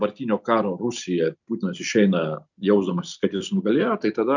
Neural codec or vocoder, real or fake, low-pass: none; real; 7.2 kHz